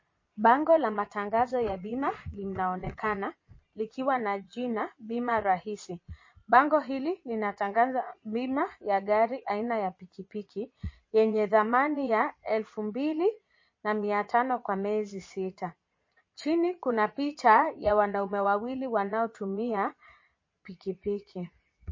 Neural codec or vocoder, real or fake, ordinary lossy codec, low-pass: vocoder, 22.05 kHz, 80 mel bands, Vocos; fake; MP3, 32 kbps; 7.2 kHz